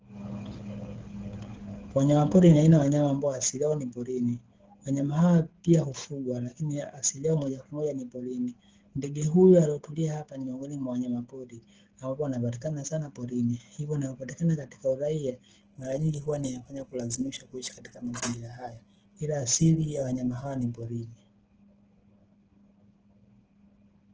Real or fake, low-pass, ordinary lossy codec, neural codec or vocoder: fake; 7.2 kHz; Opus, 16 kbps; codec, 16 kHz, 16 kbps, FreqCodec, smaller model